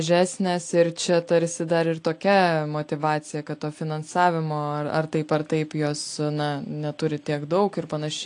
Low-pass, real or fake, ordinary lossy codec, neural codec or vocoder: 9.9 kHz; real; AAC, 48 kbps; none